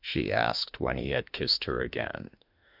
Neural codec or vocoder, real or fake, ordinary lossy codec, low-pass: autoencoder, 48 kHz, 32 numbers a frame, DAC-VAE, trained on Japanese speech; fake; AAC, 48 kbps; 5.4 kHz